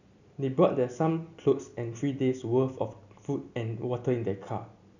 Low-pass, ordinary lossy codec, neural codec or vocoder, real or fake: 7.2 kHz; none; none; real